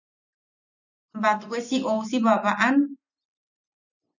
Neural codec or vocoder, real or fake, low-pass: none; real; 7.2 kHz